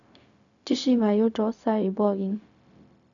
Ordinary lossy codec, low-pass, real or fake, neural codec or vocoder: none; 7.2 kHz; fake; codec, 16 kHz, 0.4 kbps, LongCat-Audio-Codec